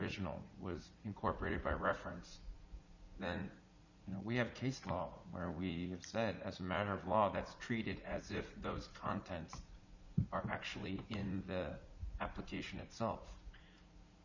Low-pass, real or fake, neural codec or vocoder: 7.2 kHz; fake; vocoder, 44.1 kHz, 80 mel bands, Vocos